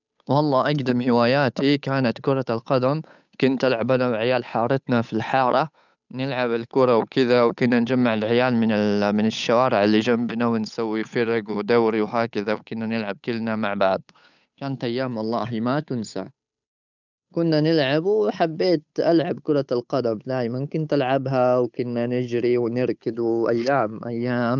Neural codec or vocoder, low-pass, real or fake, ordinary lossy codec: codec, 16 kHz, 8 kbps, FunCodec, trained on Chinese and English, 25 frames a second; 7.2 kHz; fake; none